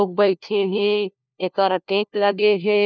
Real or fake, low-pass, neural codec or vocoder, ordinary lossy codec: fake; none; codec, 16 kHz, 1 kbps, FunCodec, trained on LibriTTS, 50 frames a second; none